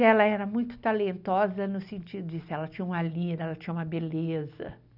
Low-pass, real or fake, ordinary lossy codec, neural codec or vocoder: 5.4 kHz; real; none; none